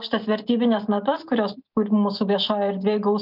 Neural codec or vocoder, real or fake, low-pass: none; real; 5.4 kHz